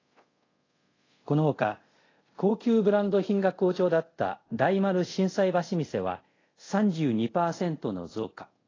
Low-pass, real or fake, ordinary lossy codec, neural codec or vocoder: 7.2 kHz; fake; AAC, 32 kbps; codec, 24 kHz, 0.5 kbps, DualCodec